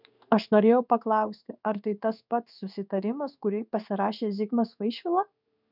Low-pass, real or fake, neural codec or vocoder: 5.4 kHz; fake; codec, 16 kHz in and 24 kHz out, 1 kbps, XY-Tokenizer